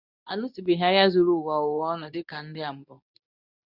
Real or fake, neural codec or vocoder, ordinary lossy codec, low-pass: fake; codec, 24 kHz, 0.9 kbps, WavTokenizer, medium speech release version 2; none; 5.4 kHz